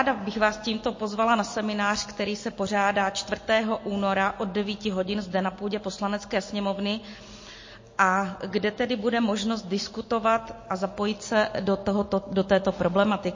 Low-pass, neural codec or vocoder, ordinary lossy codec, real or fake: 7.2 kHz; none; MP3, 32 kbps; real